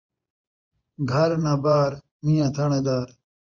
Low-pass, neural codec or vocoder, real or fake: 7.2 kHz; vocoder, 24 kHz, 100 mel bands, Vocos; fake